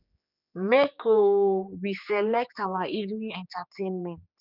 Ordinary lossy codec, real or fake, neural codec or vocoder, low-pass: none; fake; codec, 16 kHz, 4 kbps, X-Codec, HuBERT features, trained on general audio; 5.4 kHz